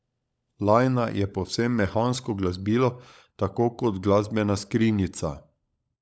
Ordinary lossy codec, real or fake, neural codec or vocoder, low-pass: none; fake; codec, 16 kHz, 16 kbps, FunCodec, trained on LibriTTS, 50 frames a second; none